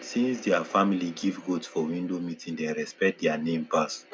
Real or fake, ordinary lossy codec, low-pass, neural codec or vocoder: real; none; none; none